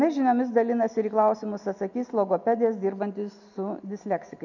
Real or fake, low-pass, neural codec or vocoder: real; 7.2 kHz; none